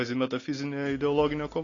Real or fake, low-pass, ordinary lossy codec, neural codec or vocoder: real; 7.2 kHz; AAC, 32 kbps; none